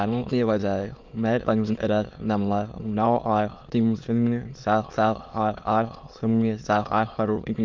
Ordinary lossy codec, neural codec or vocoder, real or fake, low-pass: Opus, 32 kbps; autoencoder, 22.05 kHz, a latent of 192 numbers a frame, VITS, trained on many speakers; fake; 7.2 kHz